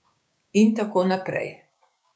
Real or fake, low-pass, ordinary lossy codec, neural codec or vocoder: fake; none; none; codec, 16 kHz, 6 kbps, DAC